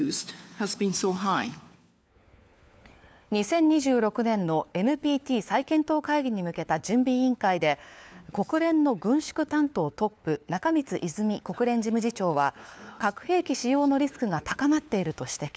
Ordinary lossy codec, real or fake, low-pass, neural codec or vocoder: none; fake; none; codec, 16 kHz, 4 kbps, FunCodec, trained on LibriTTS, 50 frames a second